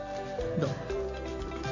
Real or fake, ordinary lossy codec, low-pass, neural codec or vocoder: real; none; 7.2 kHz; none